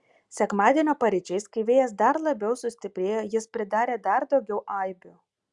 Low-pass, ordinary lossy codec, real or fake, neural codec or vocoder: 10.8 kHz; Opus, 64 kbps; real; none